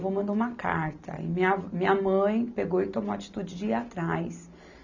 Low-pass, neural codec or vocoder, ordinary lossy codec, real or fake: 7.2 kHz; none; none; real